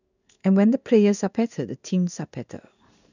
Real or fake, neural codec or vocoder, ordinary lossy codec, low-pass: fake; codec, 16 kHz in and 24 kHz out, 1 kbps, XY-Tokenizer; none; 7.2 kHz